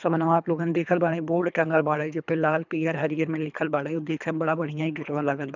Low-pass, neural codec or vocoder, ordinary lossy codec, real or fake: 7.2 kHz; codec, 24 kHz, 3 kbps, HILCodec; none; fake